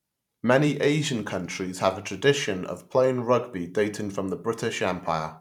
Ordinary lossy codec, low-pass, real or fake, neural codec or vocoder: none; 19.8 kHz; fake; vocoder, 44.1 kHz, 128 mel bands every 512 samples, BigVGAN v2